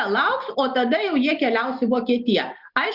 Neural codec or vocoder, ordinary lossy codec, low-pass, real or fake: none; Opus, 64 kbps; 5.4 kHz; real